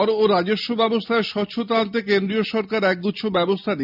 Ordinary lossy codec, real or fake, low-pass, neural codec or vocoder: none; real; 5.4 kHz; none